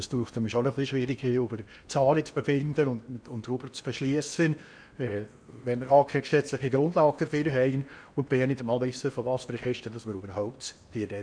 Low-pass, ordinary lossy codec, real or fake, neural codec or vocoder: 9.9 kHz; none; fake; codec, 16 kHz in and 24 kHz out, 0.8 kbps, FocalCodec, streaming, 65536 codes